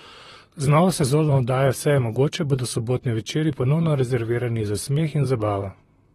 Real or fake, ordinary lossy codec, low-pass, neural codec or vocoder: fake; AAC, 32 kbps; 19.8 kHz; vocoder, 44.1 kHz, 128 mel bands, Pupu-Vocoder